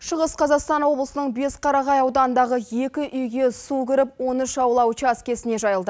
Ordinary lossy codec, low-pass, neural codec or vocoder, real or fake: none; none; none; real